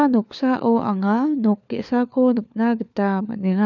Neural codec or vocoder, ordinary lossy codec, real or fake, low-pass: codec, 16 kHz, 4 kbps, FunCodec, trained on LibriTTS, 50 frames a second; none; fake; 7.2 kHz